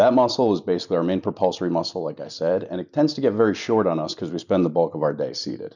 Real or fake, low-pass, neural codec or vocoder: real; 7.2 kHz; none